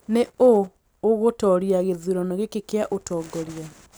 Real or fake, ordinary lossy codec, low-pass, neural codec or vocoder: real; none; none; none